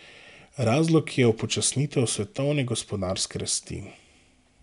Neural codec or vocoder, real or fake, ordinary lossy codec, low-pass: none; real; none; 10.8 kHz